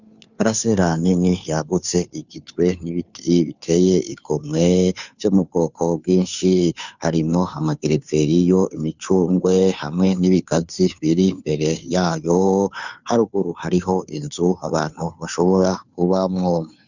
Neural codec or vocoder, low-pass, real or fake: codec, 16 kHz, 2 kbps, FunCodec, trained on Chinese and English, 25 frames a second; 7.2 kHz; fake